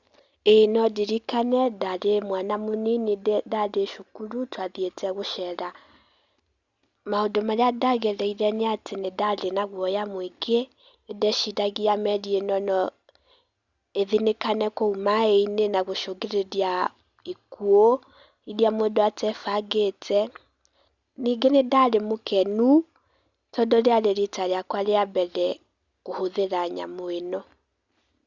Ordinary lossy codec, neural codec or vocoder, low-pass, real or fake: Opus, 64 kbps; none; 7.2 kHz; real